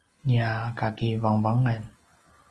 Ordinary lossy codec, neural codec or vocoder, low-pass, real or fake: Opus, 24 kbps; none; 10.8 kHz; real